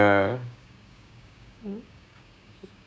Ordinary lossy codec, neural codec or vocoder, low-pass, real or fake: none; codec, 16 kHz, 6 kbps, DAC; none; fake